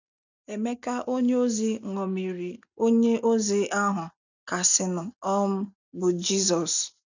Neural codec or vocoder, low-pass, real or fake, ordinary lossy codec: none; 7.2 kHz; real; none